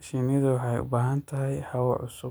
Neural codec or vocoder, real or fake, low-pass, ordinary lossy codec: vocoder, 44.1 kHz, 128 mel bands every 512 samples, BigVGAN v2; fake; none; none